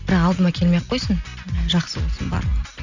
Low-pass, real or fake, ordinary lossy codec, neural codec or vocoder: 7.2 kHz; real; none; none